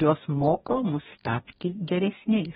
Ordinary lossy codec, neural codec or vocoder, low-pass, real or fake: AAC, 16 kbps; codec, 44.1 kHz, 2.6 kbps, DAC; 19.8 kHz; fake